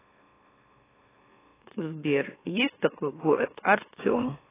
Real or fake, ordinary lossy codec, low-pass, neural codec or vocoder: fake; AAC, 16 kbps; 3.6 kHz; autoencoder, 44.1 kHz, a latent of 192 numbers a frame, MeloTTS